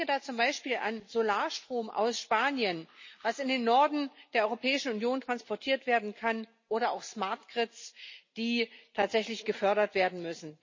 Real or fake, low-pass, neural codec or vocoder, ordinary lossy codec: real; 7.2 kHz; none; MP3, 32 kbps